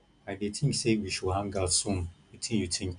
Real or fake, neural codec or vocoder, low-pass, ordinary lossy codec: real; none; 9.9 kHz; none